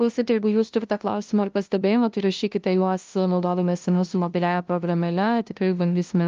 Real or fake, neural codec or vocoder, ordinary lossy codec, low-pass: fake; codec, 16 kHz, 0.5 kbps, FunCodec, trained on LibriTTS, 25 frames a second; Opus, 32 kbps; 7.2 kHz